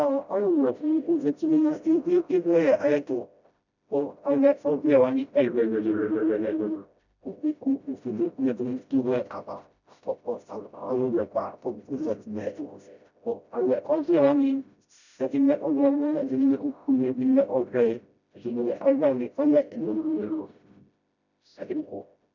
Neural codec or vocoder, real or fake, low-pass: codec, 16 kHz, 0.5 kbps, FreqCodec, smaller model; fake; 7.2 kHz